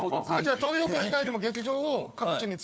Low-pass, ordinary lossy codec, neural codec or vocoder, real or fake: none; none; codec, 16 kHz, 4 kbps, FunCodec, trained on Chinese and English, 50 frames a second; fake